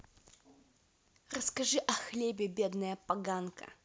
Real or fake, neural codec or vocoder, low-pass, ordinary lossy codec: real; none; none; none